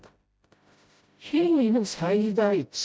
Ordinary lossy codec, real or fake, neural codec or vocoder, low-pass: none; fake; codec, 16 kHz, 0.5 kbps, FreqCodec, smaller model; none